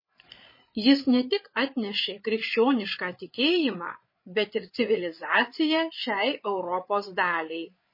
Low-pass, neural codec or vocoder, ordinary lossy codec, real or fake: 5.4 kHz; codec, 16 kHz, 8 kbps, FreqCodec, larger model; MP3, 24 kbps; fake